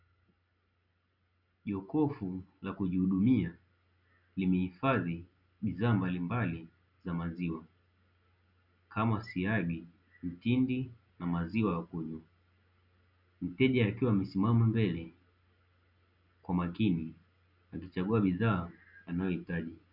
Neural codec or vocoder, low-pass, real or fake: none; 5.4 kHz; real